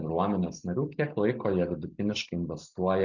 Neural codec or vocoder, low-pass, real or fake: none; 7.2 kHz; real